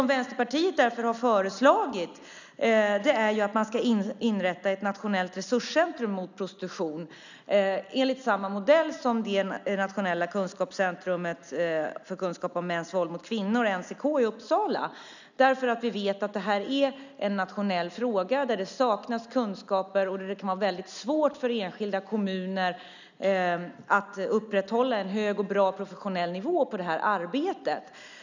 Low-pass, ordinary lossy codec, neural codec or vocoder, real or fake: 7.2 kHz; none; none; real